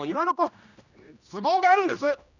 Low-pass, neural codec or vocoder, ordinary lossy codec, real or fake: 7.2 kHz; codec, 16 kHz, 1 kbps, X-Codec, HuBERT features, trained on general audio; none; fake